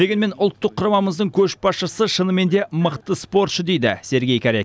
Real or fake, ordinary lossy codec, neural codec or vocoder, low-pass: real; none; none; none